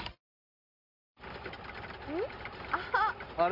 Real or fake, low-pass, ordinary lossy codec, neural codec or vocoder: real; 5.4 kHz; Opus, 32 kbps; none